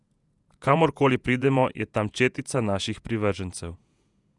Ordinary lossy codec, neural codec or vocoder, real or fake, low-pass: none; vocoder, 48 kHz, 128 mel bands, Vocos; fake; 10.8 kHz